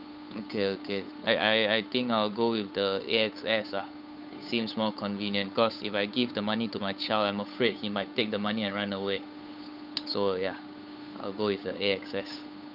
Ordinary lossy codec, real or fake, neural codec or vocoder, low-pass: none; fake; codec, 16 kHz, 8 kbps, FunCodec, trained on Chinese and English, 25 frames a second; 5.4 kHz